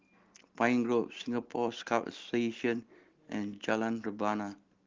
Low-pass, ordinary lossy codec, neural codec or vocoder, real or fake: 7.2 kHz; Opus, 16 kbps; none; real